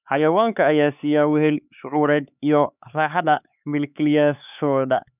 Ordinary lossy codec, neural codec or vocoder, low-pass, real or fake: none; codec, 16 kHz, 4 kbps, X-Codec, HuBERT features, trained on LibriSpeech; 3.6 kHz; fake